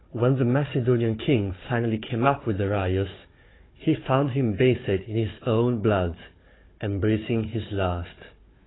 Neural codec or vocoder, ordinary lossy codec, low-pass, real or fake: codec, 16 kHz, 4 kbps, FunCodec, trained on Chinese and English, 50 frames a second; AAC, 16 kbps; 7.2 kHz; fake